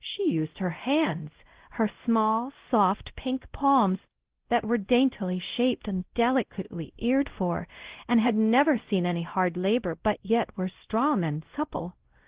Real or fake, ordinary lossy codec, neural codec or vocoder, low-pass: fake; Opus, 16 kbps; codec, 16 kHz, 0.5 kbps, X-Codec, WavLM features, trained on Multilingual LibriSpeech; 3.6 kHz